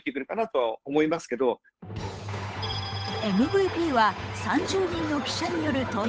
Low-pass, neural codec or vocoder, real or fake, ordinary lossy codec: none; codec, 16 kHz, 8 kbps, FunCodec, trained on Chinese and English, 25 frames a second; fake; none